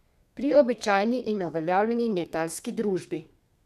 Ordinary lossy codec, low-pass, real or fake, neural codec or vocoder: none; 14.4 kHz; fake; codec, 32 kHz, 1.9 kbps, SNAC